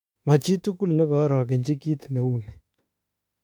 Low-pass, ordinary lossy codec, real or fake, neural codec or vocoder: 19.8 kHz; MP3, 96 kbps; fake; autoencoder, 48 kHz, 32 numbers a frame, DAC-VAE, trained on Japanese speech